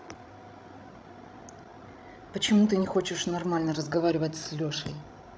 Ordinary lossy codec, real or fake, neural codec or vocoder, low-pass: none; fake; codec, 16 kHz, 16 kbps, FreqCodec, larger model; none